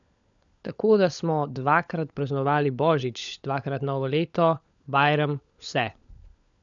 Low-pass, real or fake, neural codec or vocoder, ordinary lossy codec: 7.2 kHz; fake; codec, 16 kHz, 16 kbps, FunCodec, trained on LibriTTS, 50 frames a second; none